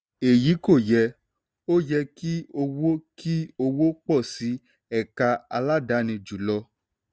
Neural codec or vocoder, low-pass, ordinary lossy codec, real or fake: none; none; none; real